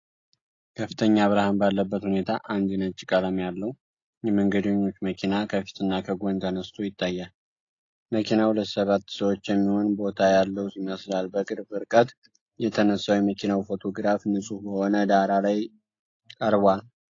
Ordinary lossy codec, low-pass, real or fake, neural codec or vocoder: AAC, 32 kbps; 7.2 kHz; real; none